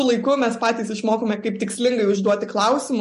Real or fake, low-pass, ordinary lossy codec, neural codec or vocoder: real; 14.4 kHz; MP3, 48 kbps; none